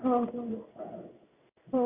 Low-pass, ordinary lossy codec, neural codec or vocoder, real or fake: 3.6 kHz; none; codec, 16 kHz in and 24 kHz out, 1 kbps, XY-Tokenizer; fake